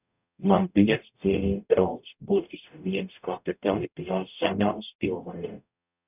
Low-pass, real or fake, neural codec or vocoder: 3.6 kHz; fake; codec, 44.1 kHz, 0.9 kbps, DAC